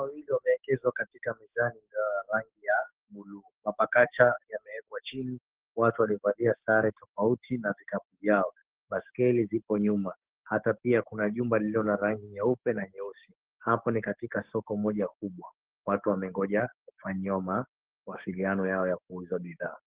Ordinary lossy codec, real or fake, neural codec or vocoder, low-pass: Opus, 16 kbps; fake; codec, 16 kHz, 6 kbps, DAC; 3.6 kHz